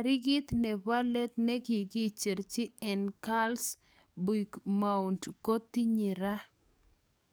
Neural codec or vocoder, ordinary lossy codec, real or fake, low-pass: codec, 44.1 kHz, 7.8 kbps, DAC; none; fake; none